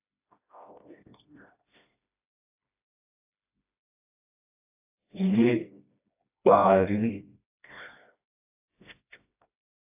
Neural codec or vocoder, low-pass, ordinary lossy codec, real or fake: codec, 16 kHz, 1 kbps, FreqCodec, smaller model; 3.6 kHz; AAC, 32 kbps; fake